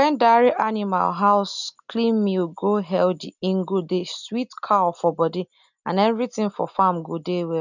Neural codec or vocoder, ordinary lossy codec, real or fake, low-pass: none; none; real; 7.2 kHz